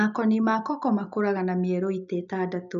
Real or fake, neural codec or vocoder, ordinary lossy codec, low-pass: real; none; none; 7.2 kHz